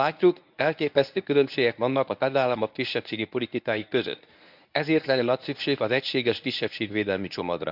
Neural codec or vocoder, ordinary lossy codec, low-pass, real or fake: codec, 24 kHz, 0.9 kbps, WavTokenizer, medium speech release version 1; none; 5.4 kHz; fake